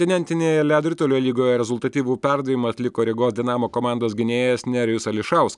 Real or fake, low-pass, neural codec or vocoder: real; 10.8 kHz; none